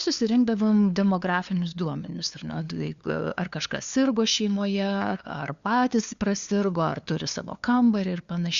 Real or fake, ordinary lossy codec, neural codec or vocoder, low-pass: fake; Opus, 64 kbps; codec, 16 kHz, 2 kbps, FunCodec, trained on LibriTTS, 25 frames a second; 7.2 kHz